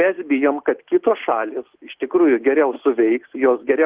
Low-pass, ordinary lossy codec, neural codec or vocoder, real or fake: 3.6 kHz; Opus, 16 kbps; none; real